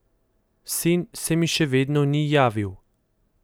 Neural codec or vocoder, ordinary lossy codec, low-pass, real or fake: none; none; none; real